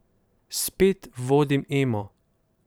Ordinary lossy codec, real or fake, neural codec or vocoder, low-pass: none; fake; vocoder, 44.1 kHz, 128 mel bands every 512 samples, BigVGAN v2; none